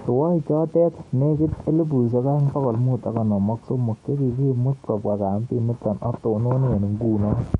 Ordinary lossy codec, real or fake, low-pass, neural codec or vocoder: MP3, 48 kbps; fake; 19.8 kHz; autoencoder, 48 kHz, 128 numbers a frame, DAC-VAE, trained on Japanese speech